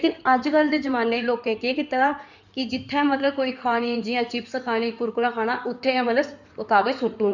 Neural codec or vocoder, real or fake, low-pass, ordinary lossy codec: codec, 16 kHz in and 24 kHz out, 2.2 kbps, FireRedTTS-2 codec; fake; 7.2 kHz; none